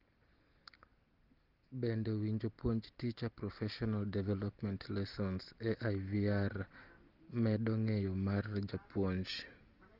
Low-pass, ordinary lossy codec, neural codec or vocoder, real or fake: 5.4 kHz; Opus, 16 kbps; none; real